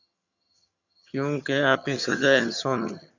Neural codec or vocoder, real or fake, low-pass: vocoder, 22.05 kHz, 80 mel bands, HiFi-GAN; fake; 7.2 kHz